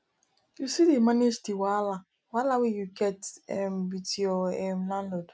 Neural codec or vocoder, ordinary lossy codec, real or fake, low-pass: none; none; real; none